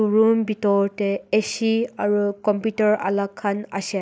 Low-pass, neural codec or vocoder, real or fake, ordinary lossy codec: none; none; real; none